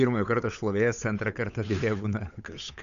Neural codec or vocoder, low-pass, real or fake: codec, 16 kHz, 8 kbps, FunCodec, trained on Chinese and English, 25 frames a second; 7.2 kHz; fake